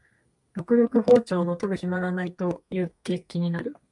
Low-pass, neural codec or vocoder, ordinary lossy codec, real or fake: 10.8 kHz; codec, 32 kHz, 1.9 kbps, SNAC; MP3, 64 kbps; fake